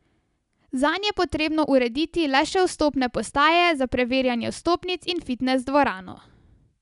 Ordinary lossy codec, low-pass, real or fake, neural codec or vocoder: none; 10.8 kHz; real; none